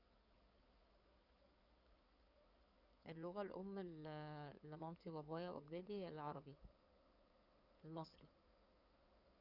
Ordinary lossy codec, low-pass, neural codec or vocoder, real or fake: none; 5.4 kHz; codec, 16 kHz in and 24 kHz out, 2.2 kbps, FireRedTTS-2 codec; fake